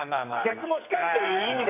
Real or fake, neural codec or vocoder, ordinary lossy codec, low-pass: fake; codec, 44.1 kHz, 2.6 kbps, SNAC; AAC, 24 kbps; 3.6 kHz